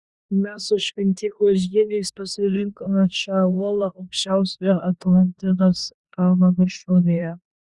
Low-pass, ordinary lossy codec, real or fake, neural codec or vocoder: 10.8 kHz; Opus, 64 kbps; fake; codec, 16 kHz in and 24 kHz out, 0.9 kbps, LongCat-Audio-Codec, four codebook decoder